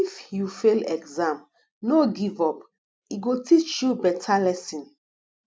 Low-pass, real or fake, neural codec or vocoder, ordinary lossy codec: none; real; none; none